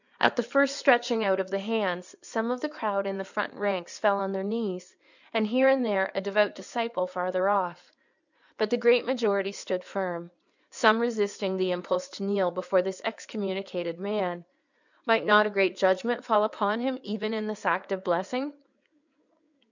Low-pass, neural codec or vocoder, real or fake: 7.2 kHz; codec, 16 kHz in and 24 kHz out, 2.2 kbps, FireRedTTS-2 codec; fake